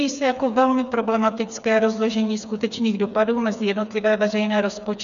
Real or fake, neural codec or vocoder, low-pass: fake; codec, 16 kHz, 4 kbps, FreqCodec, smaller model; 7.2 kHz